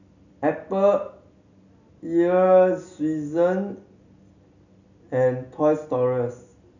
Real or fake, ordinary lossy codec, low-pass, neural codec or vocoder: real; none; 7.2 kHz; none